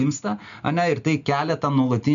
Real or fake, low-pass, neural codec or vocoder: real; 7.2 kHz; none